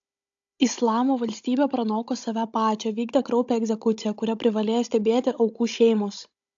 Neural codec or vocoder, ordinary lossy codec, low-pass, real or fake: codec, 16 kHz, 16 kbps, FunCodec, trained on Chinese and English, 50 frames a second; AAC, 64 kbps; 7.2 kHz; fake